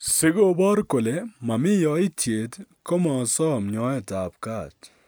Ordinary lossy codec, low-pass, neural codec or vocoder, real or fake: none; none; none; real